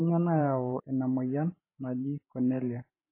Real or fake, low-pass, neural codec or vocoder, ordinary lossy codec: fake; 3.6 kHz; vocoder, 44.1 kHz, 128 mel bands every 512 samples, BigVGAN v2; MP3, 16 kbps